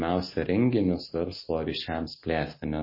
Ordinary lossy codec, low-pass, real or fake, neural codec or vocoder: MP3, 24 kbps; 5.4 kHz; real; none